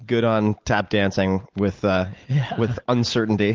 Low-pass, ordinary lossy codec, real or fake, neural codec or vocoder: 7.2 kHz; Opus, 32 kbps; real; none